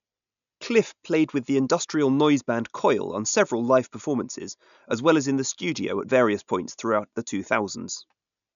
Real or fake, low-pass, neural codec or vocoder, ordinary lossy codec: real; 7.2 kHz; none; none